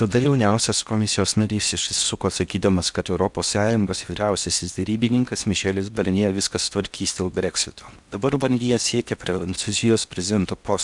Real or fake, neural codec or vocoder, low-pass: fake; codec, 16 kHz in and 24 kHz out, 0.8 kbps, FocalCodec, streaming, 65536 codes; 10.8 kHz